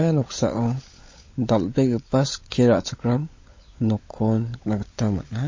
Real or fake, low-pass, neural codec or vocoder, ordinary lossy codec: fake; 7.2 kHz; codec, 16 kHz, 16 kbps, FunCodec, trained on LibriTTS, 50 frames a second; MP3, 32 kbps